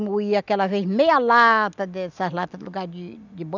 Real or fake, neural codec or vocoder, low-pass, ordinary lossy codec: real; none; 7.2 kHz; none